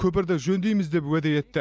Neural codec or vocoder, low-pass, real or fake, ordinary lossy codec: none; none; real; none